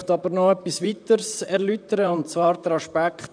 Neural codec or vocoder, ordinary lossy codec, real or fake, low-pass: vocoder, 44.1 kHz, 128 mel bands, Pupu-Vocoder; none; fake; 9.9 kHz